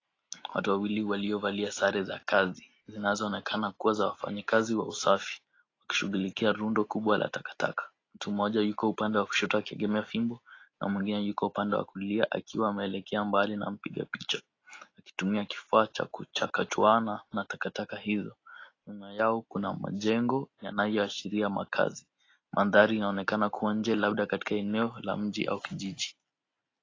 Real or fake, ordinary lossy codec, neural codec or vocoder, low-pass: real; AAC, 32 kbps; none; 7.2 kHz